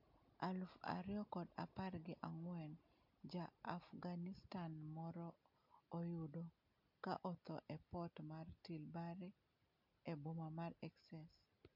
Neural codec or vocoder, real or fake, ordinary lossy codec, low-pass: none; real; MP3, 48 kbps; 5.4 kHz